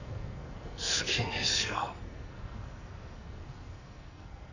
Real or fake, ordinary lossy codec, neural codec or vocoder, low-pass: fake; none; codec, 44.1 kHz, 2.6 kbps, SNAC; 7.2 kHz